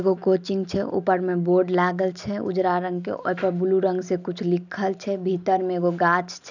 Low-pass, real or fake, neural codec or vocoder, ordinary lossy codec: 7.2 kHz; real; none; none